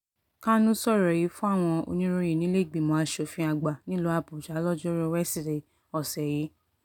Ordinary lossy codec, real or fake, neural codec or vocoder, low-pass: none; real; none; 19.8 kHz